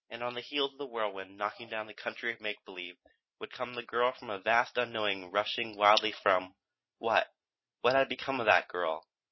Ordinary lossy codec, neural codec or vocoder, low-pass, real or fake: MP3, 24 kbps; none; 7.2 kHz; real